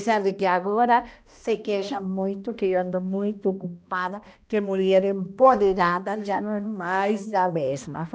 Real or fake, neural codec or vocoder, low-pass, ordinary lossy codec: fake; codec, 16 kHz, 1 kbps, X-Codec, HuBERT features, trained on balanced general audio; none; none